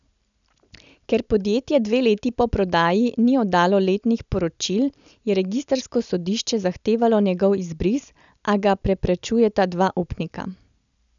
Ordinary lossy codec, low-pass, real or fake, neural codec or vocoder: none; 7.2 kHz; real; none